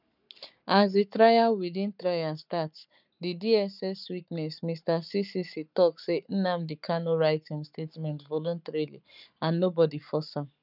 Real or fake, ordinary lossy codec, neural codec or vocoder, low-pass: fake; none; codec, 44.1 kHz, 7.8 kbps, Pupu-Codec; 5.4 kHz